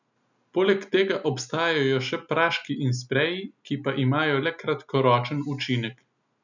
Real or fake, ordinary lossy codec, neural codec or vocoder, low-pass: real; none; none; 7.2 kHz